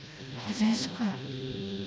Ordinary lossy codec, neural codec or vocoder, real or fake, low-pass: none; codec, 16 kHz, 1 kbps, FreqCodec, smaller model; fake; none